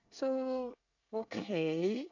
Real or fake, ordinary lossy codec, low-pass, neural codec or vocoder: fake; none; 7.2 kHz; codec, 24 kHz, 1 kbps, SNAC